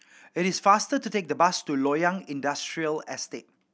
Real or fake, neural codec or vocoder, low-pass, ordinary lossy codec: real; none; none; none